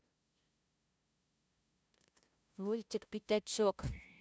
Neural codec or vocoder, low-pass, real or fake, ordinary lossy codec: codec, 16 kHz, 0.5 kbps, FunCodec, trained on LibriTTS, 25 frames a second; none; fake; none